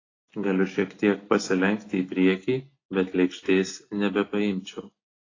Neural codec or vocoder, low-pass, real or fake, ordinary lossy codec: none; 7.2 kHz; real; AAC, 32 kbps